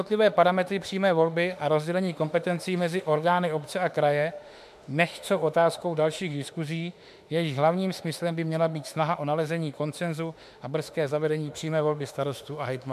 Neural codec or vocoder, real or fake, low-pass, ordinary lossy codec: autoencoder, 48 kHz, 32 numbers a frame, DAC-VAE, trained on Japanese speech; fake; 14.4 kHz; MP3, 96 kbps